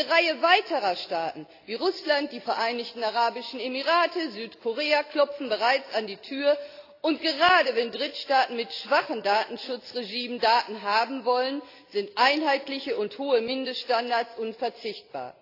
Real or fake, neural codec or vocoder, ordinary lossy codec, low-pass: real; none; AAC, 32 kbps; 5.4 kHz